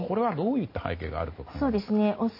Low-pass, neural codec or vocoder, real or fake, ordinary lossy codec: 5.4 kHz; none; real; MP3, 24 kbps